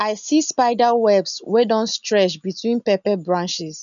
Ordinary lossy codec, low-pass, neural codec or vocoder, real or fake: none; 7.2 kHz; none; real